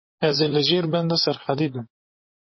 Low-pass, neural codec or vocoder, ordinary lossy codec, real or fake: 7.2 kHz; vocoder, 44.1 kHz, 128 mel bands, Pupu-Vocoder; MP3, 24 kbps; fake